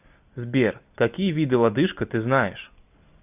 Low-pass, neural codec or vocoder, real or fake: 3.6 kHz; none; real